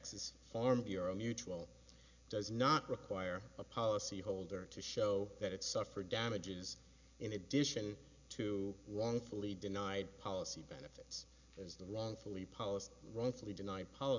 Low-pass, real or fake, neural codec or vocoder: 7.2 kHz; real; none